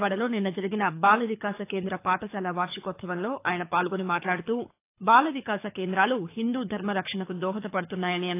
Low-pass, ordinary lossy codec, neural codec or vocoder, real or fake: 3.6 kHz; AAC, 24 kbps; codec, 24 kHz, 6 kbps, HILCodec; fake